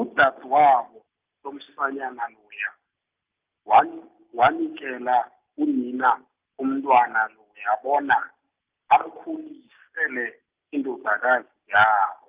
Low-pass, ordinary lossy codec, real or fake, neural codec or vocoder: 3.6 kHz; Opus, 24 kbps; real; none